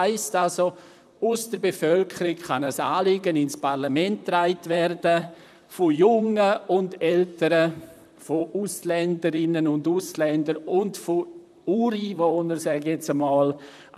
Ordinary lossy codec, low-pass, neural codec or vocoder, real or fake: none; 14.4 kHz; vocoder, 44.1 kHz, 128 mel bands, Pupu-Vocoder; fake